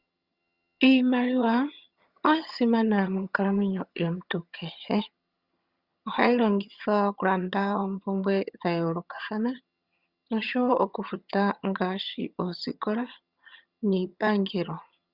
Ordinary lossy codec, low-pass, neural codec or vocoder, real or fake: Opus, 64 kbps; 5.4 kHz; vocoder, 22.05 kHz, 80 mel bands, HiFi-GAN; fake